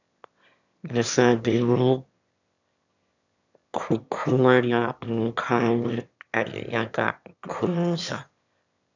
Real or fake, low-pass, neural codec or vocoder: fake; 7.2 kHz; autoencoder, 22.05 kHz, a latent of 192 numbers a frame, VITS, trained on one speaker